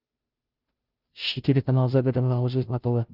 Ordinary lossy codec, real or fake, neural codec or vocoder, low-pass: Opus, 32 kbps; fake; codec, 16 kHz, 0.5 kbps, FunCodec, trained on Chinese and English, 25 frames a second; 5.4 kHz